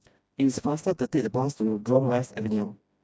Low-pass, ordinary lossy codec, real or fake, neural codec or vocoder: none; none; fake; codec, 16 kHz, 1 kbps, FreqCodec, smaller model